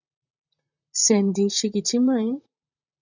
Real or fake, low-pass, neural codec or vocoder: fake; 7.2 kHz; vocoder, 44.1 kHz, 128 mel bands, Pupu-Vocoder